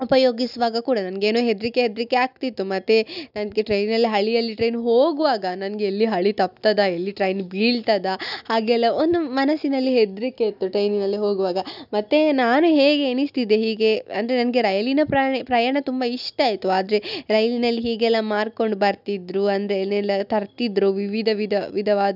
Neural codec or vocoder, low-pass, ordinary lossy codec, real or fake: none; 5.4 kHz; none; real